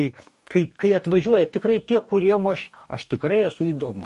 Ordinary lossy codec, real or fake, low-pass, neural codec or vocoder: MP3, 48 kbps; fake; 14.4 kHz; codec, 44.1 kHz, 2.6 kbps, DAC